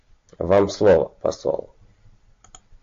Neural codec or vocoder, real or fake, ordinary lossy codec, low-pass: none; real; AAC, 48 kbps; 7.2 kHz